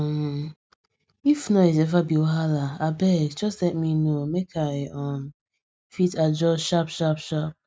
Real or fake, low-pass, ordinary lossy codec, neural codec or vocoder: real; none; none; none